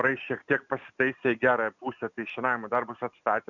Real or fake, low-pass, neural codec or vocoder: real; 7.2 kHz; none